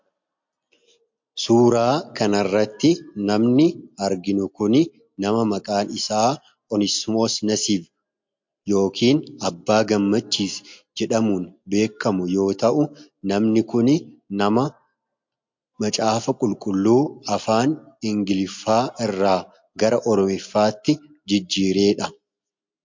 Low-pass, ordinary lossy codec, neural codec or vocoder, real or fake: 7.2 kHz; MP3, 48 kbps; none; real